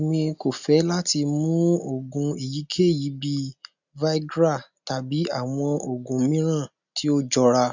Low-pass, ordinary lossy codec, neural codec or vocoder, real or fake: 7.2 kHz; none; none; real